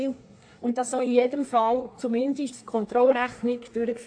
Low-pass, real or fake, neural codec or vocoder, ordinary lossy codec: 9.9 kHz; fake; codec, 24 kHz, 1 kbps, SNAC; AAC, 48 kbps